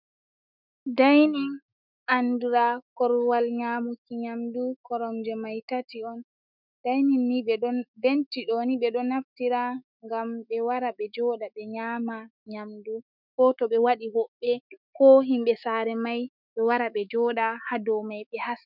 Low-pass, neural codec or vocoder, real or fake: 5.4 kHz; autoencoder, 48 kHz, 128 numbers a frame, DAC-VAE, trained on Japanese speech; fake